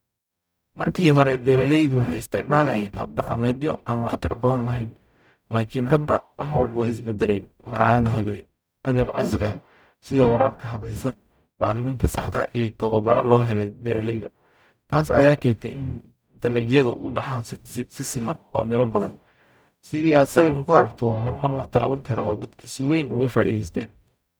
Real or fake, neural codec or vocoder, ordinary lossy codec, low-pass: fake; codec, 44.1 kHz, 0.9 kbps, DAC; none; none